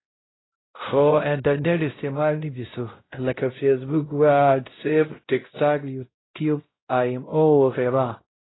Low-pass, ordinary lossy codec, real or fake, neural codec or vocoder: 7.2 kHz; AAC, 16 kbps; fake; codec, 16 kHz, 0.5 kbps, X-Codec, WavLM features, trained on Multilingual LibriSpeech